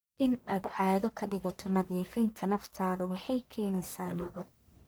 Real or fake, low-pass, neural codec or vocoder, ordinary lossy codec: fake; none; codec, 44.1 kHz, 1.7 kbps, Pupu-Codec; none